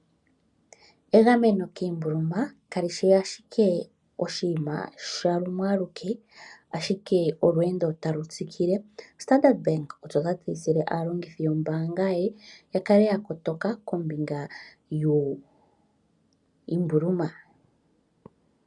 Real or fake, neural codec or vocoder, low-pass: real; none; 10.8 kHz